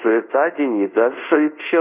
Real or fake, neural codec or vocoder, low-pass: fake; codec, 24 kHz, 0.5 kbps, DualCodec; 3.6 kHz